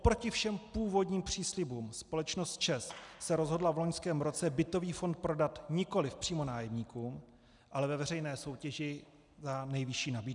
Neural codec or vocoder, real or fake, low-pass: none; real; 10.8 kHz